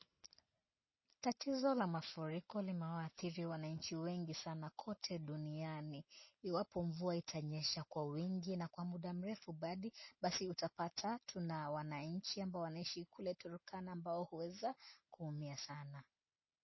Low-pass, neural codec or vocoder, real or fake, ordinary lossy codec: 7.2 kHz; none; real; MP3, 24 kbps